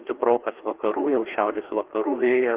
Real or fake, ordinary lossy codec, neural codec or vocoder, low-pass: fake; Opus, 16 kbps; codec, 16 kHz, 2 kbps, FreqCodec, larger model; 3.6 kHz